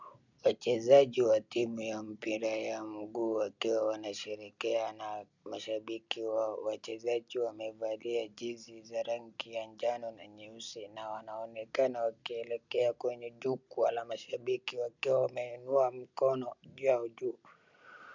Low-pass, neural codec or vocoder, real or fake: 7.2 kHz; none; real